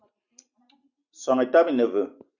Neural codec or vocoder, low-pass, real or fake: none; 7.2 kHz; real